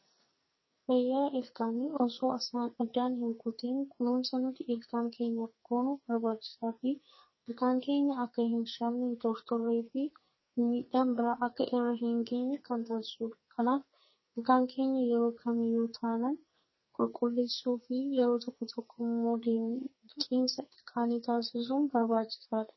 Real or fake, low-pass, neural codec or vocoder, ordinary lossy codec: fake; 7.2 kHz; codec, 44.1 kHz, 2.6 kbps, SNAC; MP3, 24 kbps